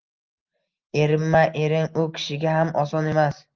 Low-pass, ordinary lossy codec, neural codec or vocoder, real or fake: 7.2 kHz; Opus, 24 kbps; none; real